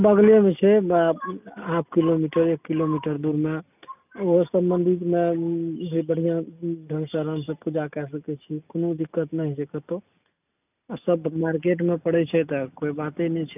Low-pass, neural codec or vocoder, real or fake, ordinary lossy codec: 3.6 kHz; none; real; none